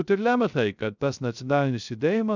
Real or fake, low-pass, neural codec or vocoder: fake; 7.2 kHz; codec, 16 kHz, 0.3 kbps, FocalCodec